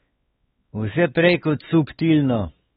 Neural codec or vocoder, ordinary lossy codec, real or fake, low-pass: codec, 16 kHz, 4 kbps, X-Codec, WavLM features, trained on Multilingual LibriSpeech; AAC, 16 kbps; fake; 7.2 kHz